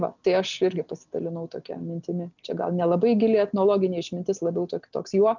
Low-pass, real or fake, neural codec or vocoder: 7.2 kHz; real; none